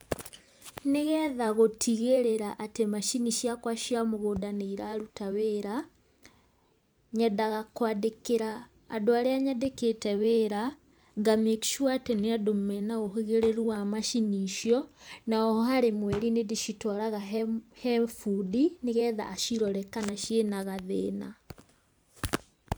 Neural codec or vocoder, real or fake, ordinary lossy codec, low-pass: vocoder, 44.1 kHz, 128 mel bands every 512 samples, BigVGAN v2; fake; none; none